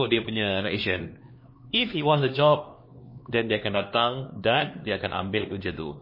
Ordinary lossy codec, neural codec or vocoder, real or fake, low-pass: MP3, 24 kbps; codec, 16 kHz, 2 kbps, X-Codec, HuBERT features, trained on LibriSpeech; fake; 5.4 kHz